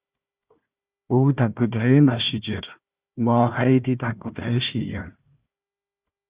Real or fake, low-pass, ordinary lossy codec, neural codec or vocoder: fake; 3.6 kHz; Opus, 64 kbps; codec, 16 kHz, 1 kbps, FunCodec, trained on Chinese and English, 50 frames a second